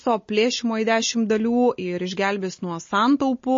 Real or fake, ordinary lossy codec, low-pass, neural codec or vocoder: real; MP3, 32 kbps; 7.2 kHz; none